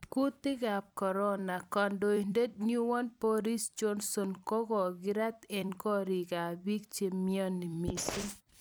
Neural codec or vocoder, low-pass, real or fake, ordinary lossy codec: vocoder, 44.1 kHz, 128 mel bands every 256 samples, BigVGAN v2; none; fake; none